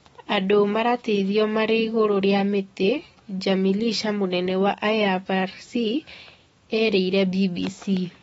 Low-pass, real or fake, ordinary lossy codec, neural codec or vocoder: 19.8 kHz; fake; AAC, 24 kbps; autoencoder, 48 kHz, 128 numbers a frame, DAC-VAE, trained on Japanese speech